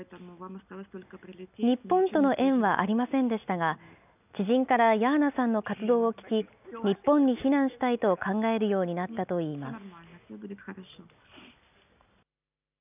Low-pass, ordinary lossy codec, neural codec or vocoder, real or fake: 3.6 kHz; none; none; real